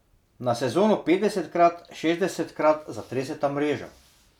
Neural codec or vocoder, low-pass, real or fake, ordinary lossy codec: none; 19.8 kHz; real; none